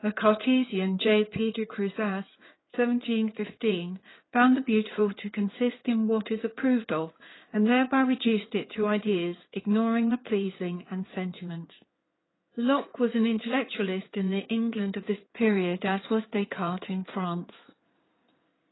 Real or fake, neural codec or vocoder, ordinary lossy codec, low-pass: fake; codec, 16 kHz in and 24 kHz out, 2.2 kbps, FireRedTTS-2 codec; AAC, 16 kbps; 7.2 kHz